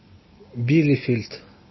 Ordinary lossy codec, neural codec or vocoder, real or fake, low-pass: MP3, 24 kbps; none; real; 7.2 kHz